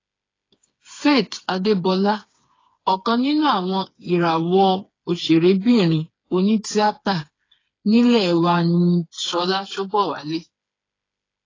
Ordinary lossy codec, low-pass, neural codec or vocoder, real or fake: AAC, 32 kbps; 7.2 kHz; codec, 16 kHz, 4 kbps, FreqCodec, smaller model; fake